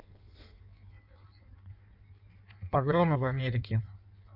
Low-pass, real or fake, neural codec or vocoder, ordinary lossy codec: 5.4 kHz; fake; codec, 16 kHz in and 24 kHz out, 1.1 kbps, FireRedTTS-2 codec; none